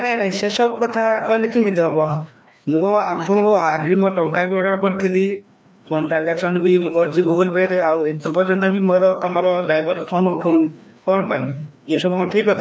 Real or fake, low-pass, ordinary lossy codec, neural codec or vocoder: fake; none; none; codec, 16 kHz, 1 kbps, FreqCodec, larger model